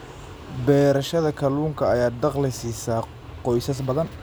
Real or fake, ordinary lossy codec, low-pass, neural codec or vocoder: real; none; none; none